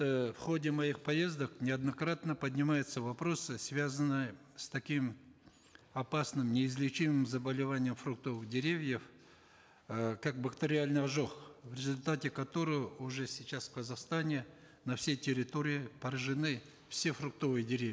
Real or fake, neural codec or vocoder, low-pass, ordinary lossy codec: real; none; none; none